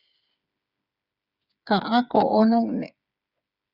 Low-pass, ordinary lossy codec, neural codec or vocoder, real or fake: 5.4 kHz; Opus, 64 kbps; codec, 16 kHz, 8 kbps, FreqCodec, smaller model; fake